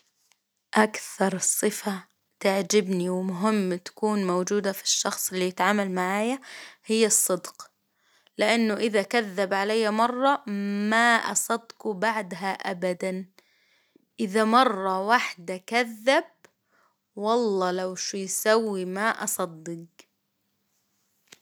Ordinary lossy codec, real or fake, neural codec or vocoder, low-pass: none; real; none; none